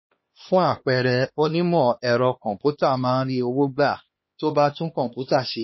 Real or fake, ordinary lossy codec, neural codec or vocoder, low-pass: fake; MP3, 24 kbps; codec, 16 kHz, 2 kbps, X-Codec, HuBERT features, trained on LibriSpeech; 7.2 kHz